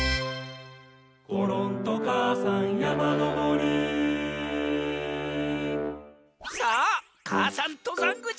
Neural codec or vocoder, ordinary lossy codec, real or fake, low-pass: none; none; real; none